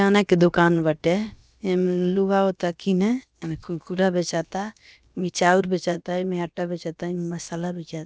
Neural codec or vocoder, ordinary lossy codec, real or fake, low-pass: codec, 16 kHz, about 1 kbps, DyCAST, with the encoder's durations; none; fake; none